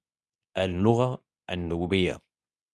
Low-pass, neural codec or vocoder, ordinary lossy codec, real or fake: none; codec, 24 kHz, 0.9 kbps, WavTokenizer, medium speech release version 2; none; fake